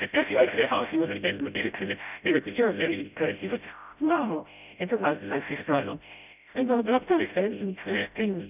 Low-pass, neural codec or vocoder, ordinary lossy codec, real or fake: 3.6 kHz; codec, 16 kHz, 0.5 kbps, FreqCodec, smaller model; none; fake